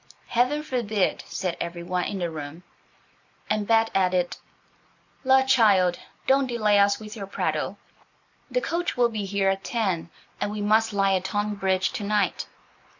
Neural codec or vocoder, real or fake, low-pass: none; real; 7.2 kHz